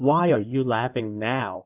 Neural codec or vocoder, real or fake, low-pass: codec, 16 kHz in and 24 kHz out, 2.2 kbps, FireRedTTS-2 codec; fake; 3.6 kHz